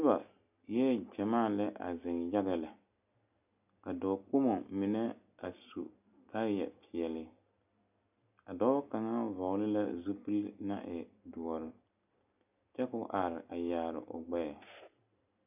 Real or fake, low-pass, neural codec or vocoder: real; 3.6 kHz; none